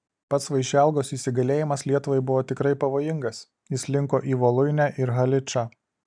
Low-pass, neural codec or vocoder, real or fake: 9.9 kHz; none; real